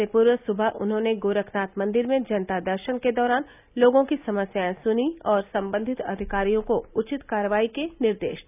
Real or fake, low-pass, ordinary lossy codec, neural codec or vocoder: real; 3.6 kHz; none; none